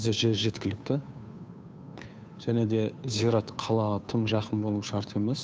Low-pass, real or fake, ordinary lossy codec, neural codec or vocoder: none; fake; none; codec, 16 kHz, 2 kbps, FunCodec, trained on Chinese and English, 25 frames a second